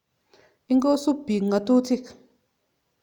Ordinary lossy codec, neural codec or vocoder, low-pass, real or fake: none; none; 19.8 kHz; real